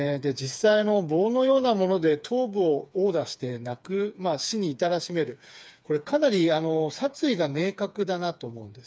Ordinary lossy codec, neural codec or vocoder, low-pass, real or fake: none; codec, 16 kHz, 4 kbps, FreqCodec, smaller model; none; fake